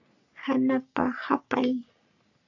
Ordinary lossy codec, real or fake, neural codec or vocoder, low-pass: AAC, 48 kbps; fake; codec, 44.1 kHz, 3.4 kbps, Pupu-Codec; 7.2 kHz